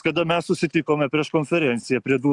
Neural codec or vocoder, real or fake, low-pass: none; real; 10.8 kHz